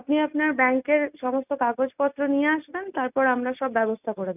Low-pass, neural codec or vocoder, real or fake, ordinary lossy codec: 3.6 kHz; none; real; none